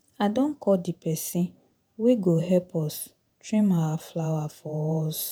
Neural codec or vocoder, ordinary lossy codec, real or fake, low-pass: vocoder, 48 kHz, 128 mel bands, Vocos; none; fake; none